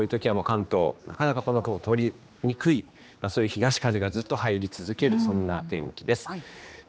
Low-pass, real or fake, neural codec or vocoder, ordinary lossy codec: none; fake; codec, 16 kHz, 2 kbps, X-Codec, HuBERT features, trained on general audio; none